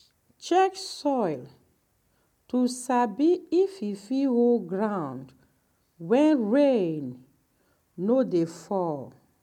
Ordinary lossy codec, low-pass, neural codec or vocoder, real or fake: MP3, 96 kbps; 19.8 kHz; none; real